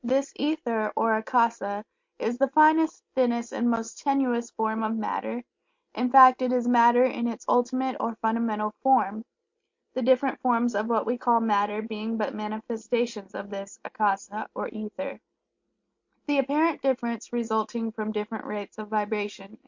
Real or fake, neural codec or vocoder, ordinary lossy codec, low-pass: real; none; MP3, 48 kbps; 7.2 kHz